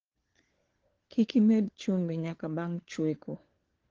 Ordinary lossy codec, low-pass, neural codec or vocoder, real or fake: Opus, 16 kbps; 7.2 kHz; codec, 16 kHz, 4 kbps, FunCodec, trained on LibriTTS, 50 frames a second; fake